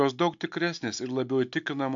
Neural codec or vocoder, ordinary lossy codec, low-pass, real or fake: none; AAC, 64 kbps; 7.2 kHz; real